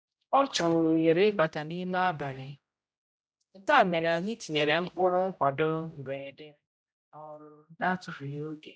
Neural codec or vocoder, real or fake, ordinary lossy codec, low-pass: codec, 16 kHz, 0.5 kbps, X-Codec, HuBERT features, trained on general audio; fake; none; none